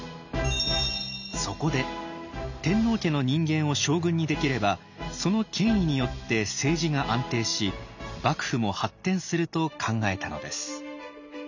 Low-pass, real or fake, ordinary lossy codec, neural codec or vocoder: 7.2 kHz; real; none; none